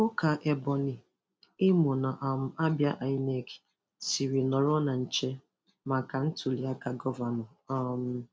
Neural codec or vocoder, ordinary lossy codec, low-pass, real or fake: none; none; none; real